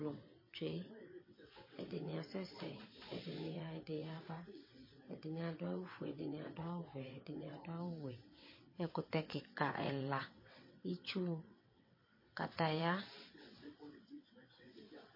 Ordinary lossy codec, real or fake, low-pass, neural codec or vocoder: MP3, 24 kbps; fake; 5.4 kHz; vocoder, 22.05 kHz, 80 mel bands, WaveNeXt